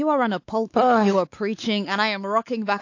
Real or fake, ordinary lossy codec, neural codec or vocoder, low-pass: fake; AAC, 48 kbps; codec, 16 kHz, 4 kbps, X-Codec, WavLM features, trained on Multilingual LibriSpeech; 7.2 kHz